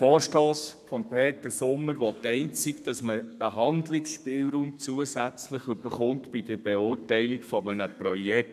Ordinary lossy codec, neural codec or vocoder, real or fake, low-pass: none; codec, 32 kHz, 1.9 kbps, SNAC; fake; 14.4 kHz